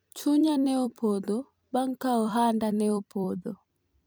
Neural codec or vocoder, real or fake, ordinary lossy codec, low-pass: vocoder, 44.1 kHz, 128 mel bands every 256 samples, BigVGAN v2; fake; none; none